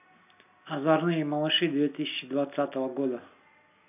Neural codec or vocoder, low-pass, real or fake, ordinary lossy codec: none; 3.6 kHz; real; none